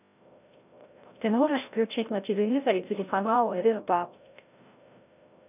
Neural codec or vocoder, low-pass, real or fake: codec, 16 kHz, 0.5 kbps, FreqCodec, larger model; 3.6 kHz; fake